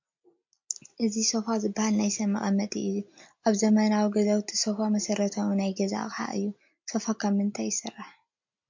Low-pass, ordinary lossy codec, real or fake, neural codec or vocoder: 7.2 kHz; MP3, 48 kbps; real; none